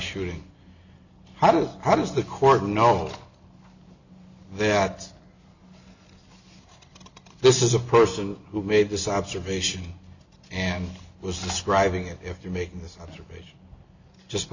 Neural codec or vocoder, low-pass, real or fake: none; 7.2 kHz; real